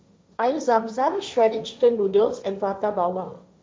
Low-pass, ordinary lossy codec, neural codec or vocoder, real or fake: none; none; codec, 16 kHz, 1.1 kbps, Voila-Tokenizer; fake